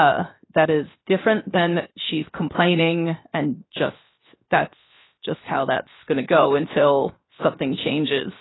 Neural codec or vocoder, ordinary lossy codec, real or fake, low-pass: codec, 16 kHz, 0.7 kbps, FocalCodec; AAC, 16 kbps; fake; 7.2 kHz